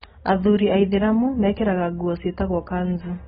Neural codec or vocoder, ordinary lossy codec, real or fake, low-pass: none; AAC, 16 kbps; real; 19.8 kHz